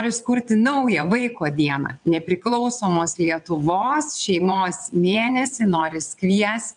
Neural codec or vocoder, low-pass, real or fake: vocoder, 22.05 kHz, 80 mel bands, WaveNeXt; 9.9 kHz; fake